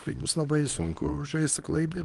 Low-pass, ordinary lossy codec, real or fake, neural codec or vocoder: 10.8 kHz; Opus, 32 kbps; fake; codec, 24 kHz, 0.9 kbps, WavTokenizer, small release